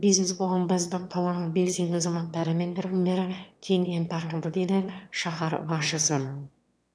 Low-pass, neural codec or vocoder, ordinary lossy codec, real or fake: none; autoencoder, 22.05 kHz, a latent of 192 numbers a frame, VITS, trained on one speaker; none; fake